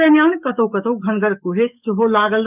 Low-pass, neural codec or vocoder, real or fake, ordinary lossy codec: 3.6 kHz; codec, 44.1 kHz, 7.8 kbps, DAC; fake; none